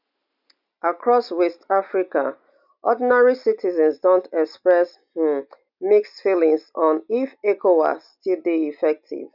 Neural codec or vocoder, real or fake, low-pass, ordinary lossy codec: none; real; 5.4 kHz; none